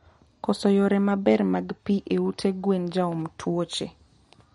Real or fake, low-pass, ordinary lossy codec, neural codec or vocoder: real; 19.8 kHz; MP3, 48 kbps; none